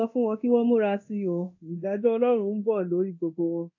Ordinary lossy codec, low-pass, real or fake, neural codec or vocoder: AAC, 48 kbps; 7.2 kHz; fake; codec, 24 kHz, 0.9 kbps, DualCodec